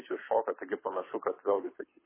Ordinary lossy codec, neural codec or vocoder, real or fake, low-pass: MP3, 16 kbps; codec, 24 kHz, 6 kbps, HILCodec; fake; 3.6 kHz